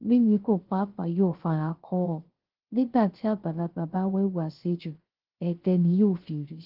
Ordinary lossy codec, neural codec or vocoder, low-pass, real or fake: Opus, 16 kbps; codec, 16 kHz, 0.3 kbps, FocalCodec; 5.4 kHz; fake